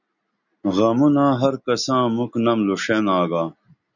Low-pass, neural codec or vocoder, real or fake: 7.2 kHz; none; real